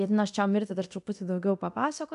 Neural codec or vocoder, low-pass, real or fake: codec, 24 kHz, 0.9 kbps, DualCodec; 10.8 kHz; fake